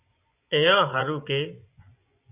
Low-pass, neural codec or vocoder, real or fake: 3.6 kHz; vocoder, 24 kHz, 100 mel bands, Vocos; fake